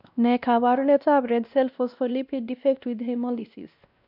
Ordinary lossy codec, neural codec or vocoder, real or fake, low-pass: none; codec, 16 kHz, 1 kbps, X-Codec, WavLM features, trained on Multilingual LibriSpeech; fake; 5.4 kHz